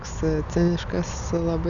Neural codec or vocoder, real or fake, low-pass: none; real; 7.2 kHz